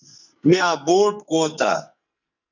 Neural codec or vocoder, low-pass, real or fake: codec, 44.1 kHz, 2.6 kbps, SNAC; 7.2 kHz; fake